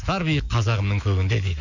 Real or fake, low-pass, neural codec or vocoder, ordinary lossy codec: fake; 7.2 kHz; autoencoder, 48 kHz, 128 numbers a frame, DAC-VAE, trained on Japanese speech; none